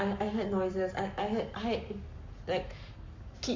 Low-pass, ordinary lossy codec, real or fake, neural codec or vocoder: 7.2 kHz; MP3, 48 kbps; fake; codec, 44.1 kHz, 7.8 kbps, Pupu-Codec